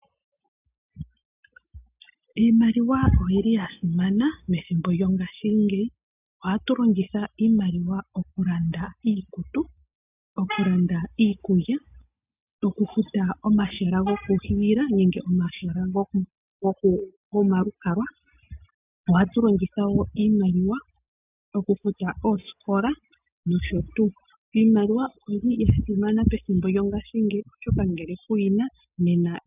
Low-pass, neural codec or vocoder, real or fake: 3.6 kHz; none; real